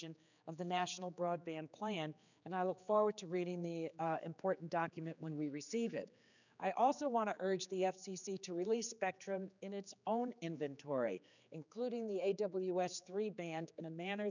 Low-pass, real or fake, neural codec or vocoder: 7.2 kHz; fake; codec, 16 kHz, 4 kbps, X-Codec, HuBERT features, trained on general audio